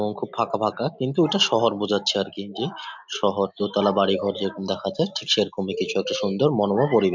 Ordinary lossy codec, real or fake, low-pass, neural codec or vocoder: MP3, 64 kbps; real; 7.2 kHz; none